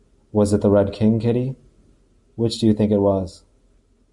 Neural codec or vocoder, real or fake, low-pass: none; real; 10.8 kHz